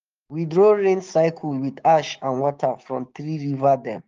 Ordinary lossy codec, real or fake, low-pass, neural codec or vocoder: Opus, 32 kbps; fake; 7.2 kHz; codec, 16 kHz, 6 kbps, DAC